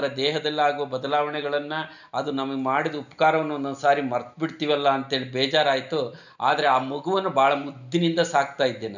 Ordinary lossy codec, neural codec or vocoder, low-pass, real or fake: none; none; 7.2 kHz; real